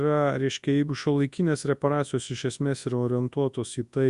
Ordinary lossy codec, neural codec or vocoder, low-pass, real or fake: Opus, 64 kbps; codec, 24 kHz, 0.9 kbps, WavTokenizer, large speech release; 10.8 kHz; fake